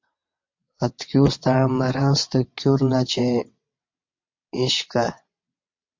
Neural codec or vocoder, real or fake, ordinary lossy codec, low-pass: vocoder, 22.05 kHz, 80 mel bands, WaveNeXt; fake; MP3, 48 kbps; 7.2 kHz